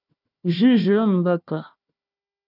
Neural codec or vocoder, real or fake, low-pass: codec, 16 kHz, 1 kbps, FunCodec, trained on Chinese and English, 50 frames a second; fake; 5.4 kHz